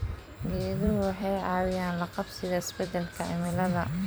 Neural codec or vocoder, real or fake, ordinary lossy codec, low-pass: vocoder, 44.1 kHz, 128 mel bands every 256 samples, BigVGAN v2; fake; none; none